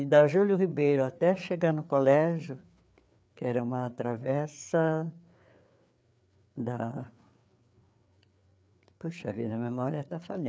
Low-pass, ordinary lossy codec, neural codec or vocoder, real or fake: none; none; codec, 16 kHz, 4 kbps, FreqCodec, larger model; fake